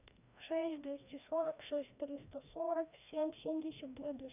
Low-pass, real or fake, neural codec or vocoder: 3.6 kHz; fake; codec, 16 kHz, 1 kbps, FreqCodec, larger model